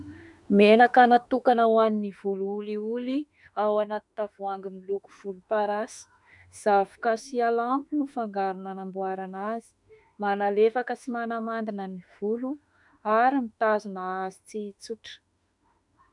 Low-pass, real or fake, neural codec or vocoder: 10.8 kHz; fake; autoencoder, 48 kHz, 32 numbers a frame, DAC-VAE, trained on Japanese speech